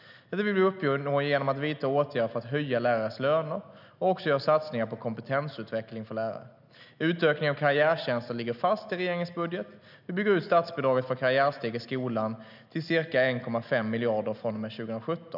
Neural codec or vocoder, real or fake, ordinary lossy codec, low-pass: none; real; none; 5.4 kHz